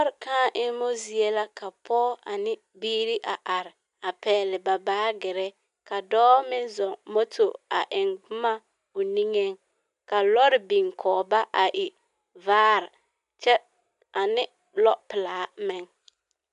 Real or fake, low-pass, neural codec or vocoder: real; 10.8 kHz; none